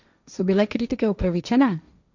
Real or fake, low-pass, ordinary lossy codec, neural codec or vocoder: fake; 7.2 kHz; none; codec, 16 kHz, 1.1 kbps, Voila-Tokenizer